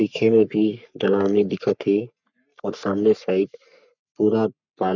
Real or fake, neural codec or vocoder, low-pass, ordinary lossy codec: fake; codec, 44.1 kHz, 3.4 kbps, Pupu-Codec; 7.2 kHz; none